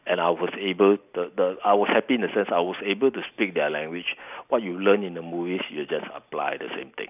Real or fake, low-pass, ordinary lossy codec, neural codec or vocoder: real; 3.6 kHz; none; none